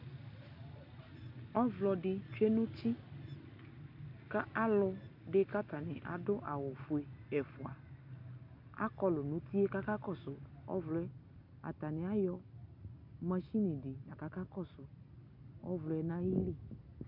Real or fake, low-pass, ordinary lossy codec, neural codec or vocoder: real; 5.4 kHz; MP3, 48 kbps; none